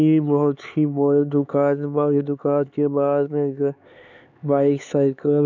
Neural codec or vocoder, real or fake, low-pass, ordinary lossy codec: codec, 16 kHz, 4 kbps, X-Codec, HuBERT features, trained on LibriSpeech; fake; 7.2 kHz; none